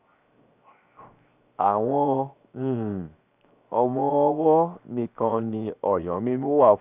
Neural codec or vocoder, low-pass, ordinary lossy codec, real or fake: codec, 16 kHz, 0.7 kbps, FocalCodec; 3.6 kHz; none; fake